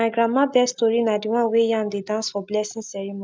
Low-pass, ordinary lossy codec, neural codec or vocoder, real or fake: none; none; none; real